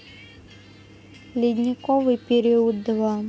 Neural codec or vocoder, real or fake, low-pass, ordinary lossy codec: none; real; none; none